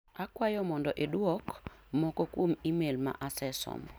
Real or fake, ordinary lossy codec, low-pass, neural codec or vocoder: real; none; none; none